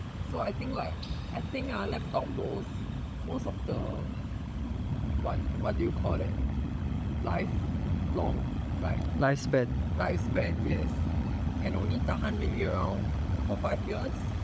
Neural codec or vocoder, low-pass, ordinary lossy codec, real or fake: codec, 16 kHz, 16 kbps, FunCodec, trained on LibriTTS, 50 frames a second; none; none; fake